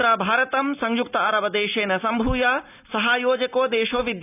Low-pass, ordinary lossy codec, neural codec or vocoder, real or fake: 3.6 kHz; none; none; real